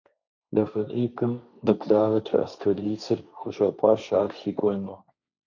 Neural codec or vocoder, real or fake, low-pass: codec, 16 kHz, 1.1 kbps, Voila-Tokenizer; fake; 7.2 kHz